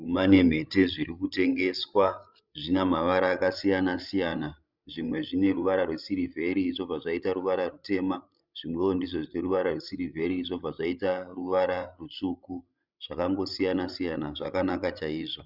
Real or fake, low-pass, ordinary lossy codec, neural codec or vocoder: fake; 5.4 kHz; Opus, 64 kbps; codec, 16 kHz, 16 kbps, FreqCodec, larger model